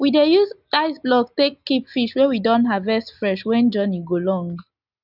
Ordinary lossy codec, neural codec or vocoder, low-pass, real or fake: none; none; 5.4 kHz; real